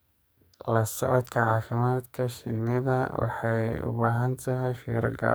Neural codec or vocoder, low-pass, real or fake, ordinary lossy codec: codec, 44.1 kHz, 2.6 kbps, SNAC; none; fake; none